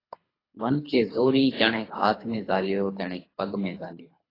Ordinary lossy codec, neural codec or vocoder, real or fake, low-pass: AAC, 24 kbps; codec, 24 kHz, 3 kbps, HILCodec; fake; 5.4 kHz